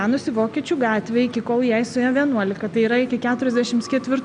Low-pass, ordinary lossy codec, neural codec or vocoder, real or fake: 9.9 kHz; MP3, 96 kbps; none; real